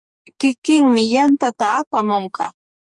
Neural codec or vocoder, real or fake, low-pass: codec, 44.1 kHz, 2.6 kbps, DAC; fake; 10.8 kHz